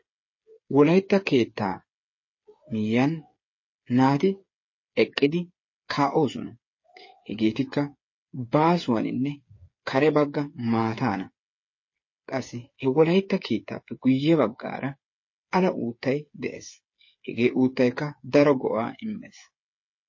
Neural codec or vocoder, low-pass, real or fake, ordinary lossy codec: codec, 16 kHz, 8 kbps, FreqCodec, smaller model; 7.2 kHz; fake; MP3, 32 kbps